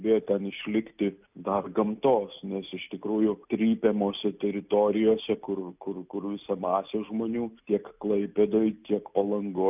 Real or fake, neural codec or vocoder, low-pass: real; none; 3.6 kHz